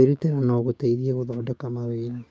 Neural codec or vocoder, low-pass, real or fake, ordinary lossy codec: codec, 16 kHz, 6 kbps, DAC; none; fake; none